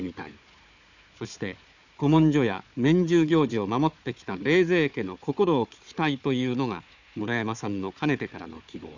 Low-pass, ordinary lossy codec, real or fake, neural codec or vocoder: 7.2 kHz; none; fake; codec, 16 kHz, 4 kbps, FunCodec, trained on Chinese and English, 50 frames a second